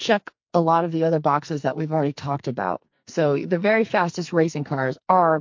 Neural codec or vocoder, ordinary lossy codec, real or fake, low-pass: codec, 44.1 kHz, 2.6 kbps, SNAC; MP3, 48 kbps; fake; 7.2 kHz